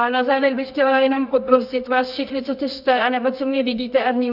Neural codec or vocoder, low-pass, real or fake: codec, 24 kHz, 0.9 kbps, WavTokenizer, medium music audio release; 5.4 kHz; fake